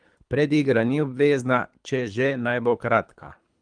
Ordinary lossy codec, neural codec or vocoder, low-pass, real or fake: Opus, 32 kbps; codec, 24 kHz, 3 kbps, HILCodec; 9.9 kHz; fake